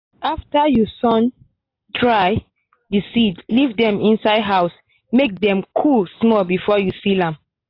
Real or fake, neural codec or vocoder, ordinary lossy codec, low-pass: real; none; AAC, 32 kbps; 5.4 kHz